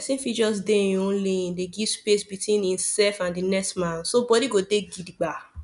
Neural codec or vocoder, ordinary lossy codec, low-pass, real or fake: none; none; 10.8 kHz; real